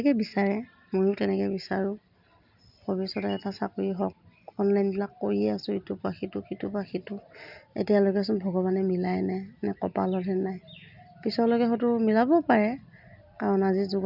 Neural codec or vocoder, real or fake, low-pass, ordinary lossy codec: none; real; 5.4 kHz; none